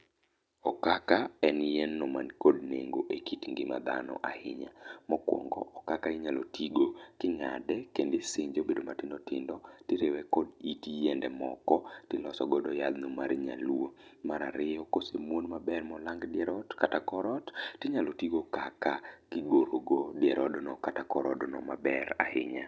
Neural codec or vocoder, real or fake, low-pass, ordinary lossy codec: none; real; none; none